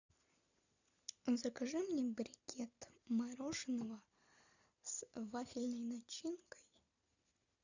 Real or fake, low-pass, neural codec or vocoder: real; 7.2 kHz; none